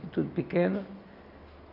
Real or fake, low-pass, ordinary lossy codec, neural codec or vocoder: real; 5.4 kHz; none; none